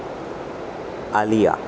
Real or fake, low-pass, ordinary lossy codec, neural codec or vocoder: real; none; none; none